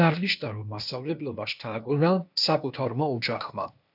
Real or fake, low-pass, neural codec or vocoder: fake; 5.4 kHz; codec, 16 kHz, 0.8 kbps, ZipCodec